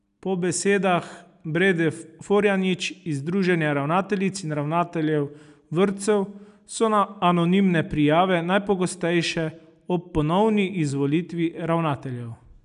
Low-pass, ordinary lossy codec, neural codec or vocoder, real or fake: 10.8 kHz; none; none; real